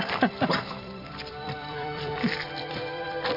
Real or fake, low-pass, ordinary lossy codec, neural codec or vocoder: fake; 5.4 kHz; MP3, 48 kbps; vocoder, 44.1 kHz, 128 mel bands every 256 samples, BigVGAN v2